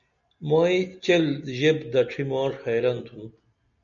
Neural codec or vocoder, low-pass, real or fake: none; 7.2 kHz; real